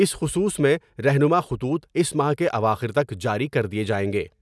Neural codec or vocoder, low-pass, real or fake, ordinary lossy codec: none; none; real; none